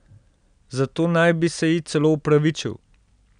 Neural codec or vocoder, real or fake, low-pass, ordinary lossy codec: none; real; 9.9 kHz; none